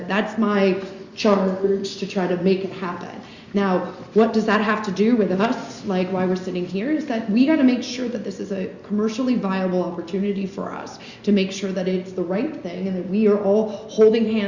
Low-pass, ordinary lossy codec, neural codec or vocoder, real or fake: 7.2 kHz; Opus, 64 kbps; none; real